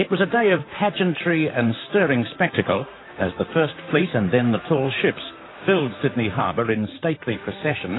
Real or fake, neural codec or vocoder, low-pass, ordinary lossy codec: fake; vocoder, 44.1 kHz, 128 mel bands, Pupu-Vocoder; 7.2 kHz; AAC, 16 kbps